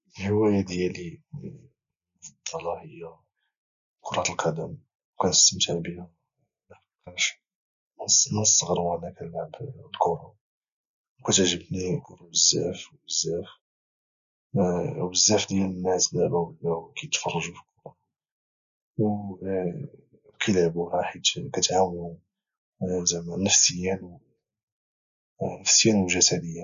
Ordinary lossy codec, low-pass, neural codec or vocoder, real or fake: none; 7.2 kHz; none; real